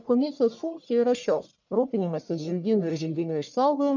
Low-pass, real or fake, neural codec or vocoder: 7.2 kHz; fake; codec, 44.1 kHz, 1.7 kbps, Pupu-Codec